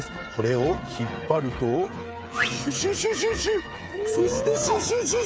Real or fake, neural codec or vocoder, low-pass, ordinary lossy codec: fake; codec, 16 kHz, 8 kbps, FreqCodec, smaller model; none; none